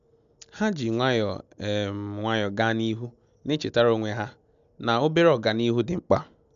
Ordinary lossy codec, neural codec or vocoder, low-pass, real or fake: none; none; 7.2 kHz; real